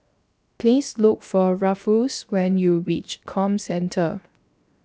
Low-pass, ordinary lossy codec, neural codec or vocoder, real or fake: none; none; codec, 16 kHz, 0.7 kbps, FocalCodec; fake